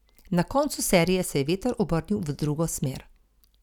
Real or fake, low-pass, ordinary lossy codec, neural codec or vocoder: real; 19.8 kHz; none; none